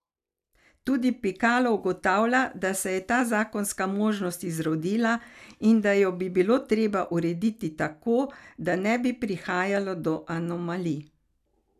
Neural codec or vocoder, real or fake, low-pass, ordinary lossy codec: none; real; 14.4 kHz; none